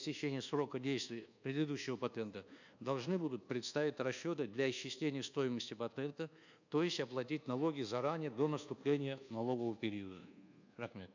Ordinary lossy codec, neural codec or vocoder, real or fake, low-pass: none; codec, 24 kHz, 1.2 kbps, DualCodec; fake; 7.2 kHz